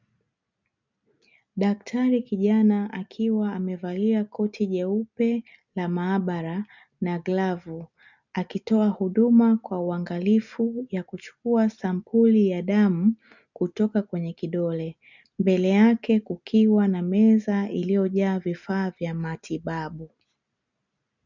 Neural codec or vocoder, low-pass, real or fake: none; 7.2 kHz; real